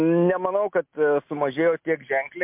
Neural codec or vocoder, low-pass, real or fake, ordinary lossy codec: none; 3.6 kHz; real; MP3, 32 kbps